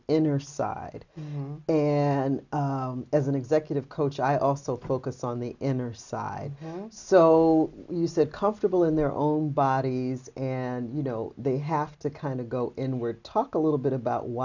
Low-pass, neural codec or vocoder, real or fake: 7.2 kHz; none; real